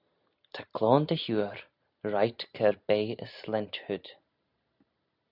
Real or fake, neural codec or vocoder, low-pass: real; none; 5.4 kHz